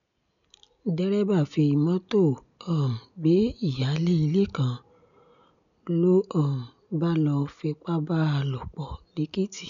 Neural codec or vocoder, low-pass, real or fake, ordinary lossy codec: none; 7.2 kHz; real; none